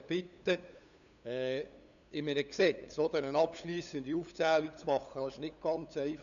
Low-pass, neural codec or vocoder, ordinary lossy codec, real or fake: 7.2 kHz; codec, 16 kHz, 8 kbps, FunCodec, trained on LibriTTS, 25 frames a second; none; fake